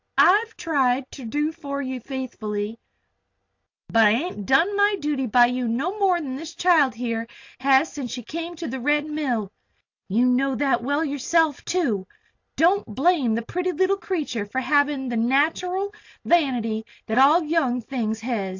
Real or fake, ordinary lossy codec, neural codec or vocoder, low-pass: real; AAC, 48 kbps; none; 7.2 kHz